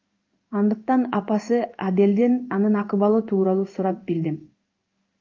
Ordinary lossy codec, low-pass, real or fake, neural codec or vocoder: Opus, 24 kbps; 7.2 kHz; fake; codec, 16 kHz in and 24 kHz out, 1 kbps, XY-Tokenizer